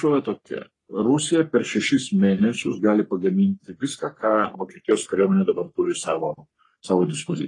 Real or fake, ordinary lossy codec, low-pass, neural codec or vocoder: fake; AAC, 32 kbps; 10.8 kHz; codec, 44.1 kHz, 3.4 kbps, Pupu-Codec